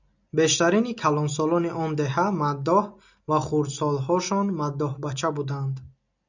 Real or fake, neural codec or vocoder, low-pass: real; none; 7.2 kHz